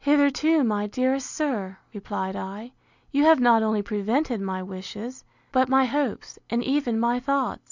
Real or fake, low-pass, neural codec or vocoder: real; 7.2 kHz; none